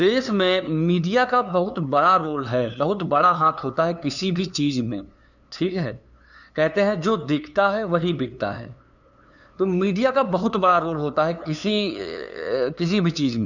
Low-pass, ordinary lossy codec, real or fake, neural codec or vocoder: 7.2 kHz; none; fake; codec, 16 kHz, 2 kbps, FunCodec, trained on LibriTTS, 25 frames a second